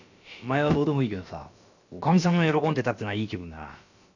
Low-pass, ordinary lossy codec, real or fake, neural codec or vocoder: 7.2 kHz; none; fake; codec, 16 kHz, about 1 kbps, DyCAST, with the encoder's durations